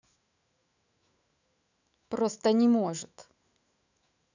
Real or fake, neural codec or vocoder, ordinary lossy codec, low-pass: fake; autoencoder, 48 kHz, 128 numbers a frame, DAC-VAE, trained on Japanese speech; none; 7.2 kHz